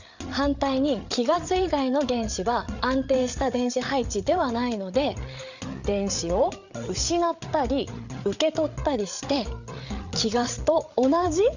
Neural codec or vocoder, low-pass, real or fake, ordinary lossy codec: codec, 16 kHz, 8 kbps, FreqCodec, larger model; 7.2 kHz; fake; none